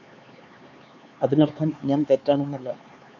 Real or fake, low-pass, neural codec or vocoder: fake; 7.2 kHz; codec, 16 kHz, 4 kbps, X-Codec, HuBERT features, trained on LibriSpeech